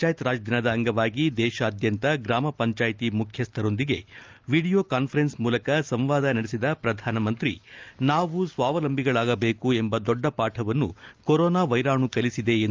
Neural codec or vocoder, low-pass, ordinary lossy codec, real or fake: none; 7.2 kHz; Opus, 32 kbps; real